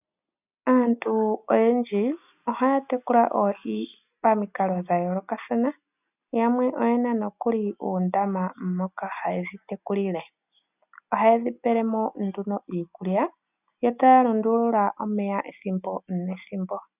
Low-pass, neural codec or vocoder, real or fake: 3.6 kHz; none; real